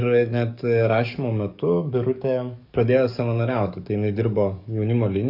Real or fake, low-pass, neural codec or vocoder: fake; 5.4 kHz; codec, 44.1 kHz, 7.8 kbps, DAC